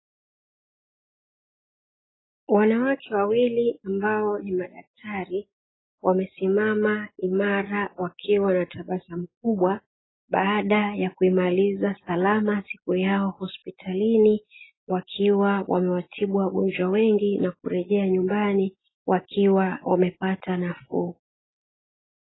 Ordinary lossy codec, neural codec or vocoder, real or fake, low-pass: AAC, 16 kbps; none; real; 7.2 kHz